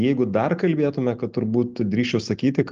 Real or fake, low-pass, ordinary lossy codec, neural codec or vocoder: real; 7.2 kHz; Opus, 32 kbps; none